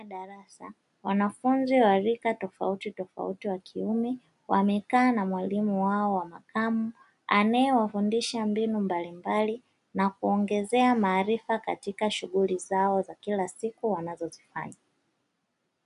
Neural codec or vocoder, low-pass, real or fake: none; 10.8 kHz; real